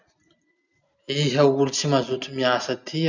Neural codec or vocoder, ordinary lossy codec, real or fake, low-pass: none; none; real; 7.2 kHz